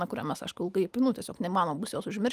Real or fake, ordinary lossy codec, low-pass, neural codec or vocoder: real; Opus, 32 kbps; 14.4 kHz; none